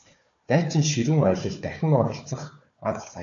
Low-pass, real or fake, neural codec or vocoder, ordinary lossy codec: 7.2 kHz; fake; codec, 16 kHz, 4 kbps, FunCodec, trained on Chinese and English, 50 frames a second; AAC, 48 kbps